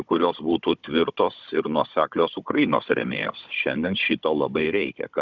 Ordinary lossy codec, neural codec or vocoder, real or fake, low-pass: Opus, 64 kbps; codec, 16 kHz, 16 kbps, FunCodec, trained on Chinese and English, 50 frames a second; fake; 7.2 kHz